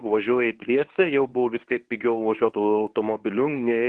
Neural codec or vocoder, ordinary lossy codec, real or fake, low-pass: codec, 24 kHz, 0.9 kbps, WavTokenizer, medium speech release version 1; Opus, 16 kbps; fake; 10.8 kHz